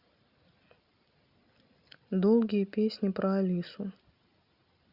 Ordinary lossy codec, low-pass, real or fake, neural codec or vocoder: Opus, 64 kbps; 5.4 kHz; real; none